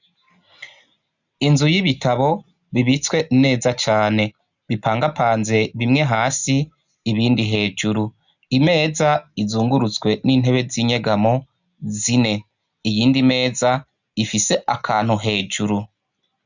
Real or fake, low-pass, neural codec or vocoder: real; 7.2 kHz; none